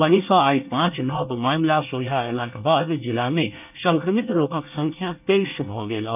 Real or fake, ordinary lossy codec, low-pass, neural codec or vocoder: fake; none; 3.6 kHz; codec, 24 kHz, 1 kbps, SNAC